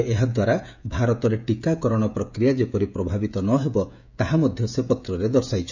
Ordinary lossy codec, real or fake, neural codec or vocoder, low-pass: none; fake; codec, 16 kHz, 16 kbps, FreqCodec, smaller model; 7.2 kHz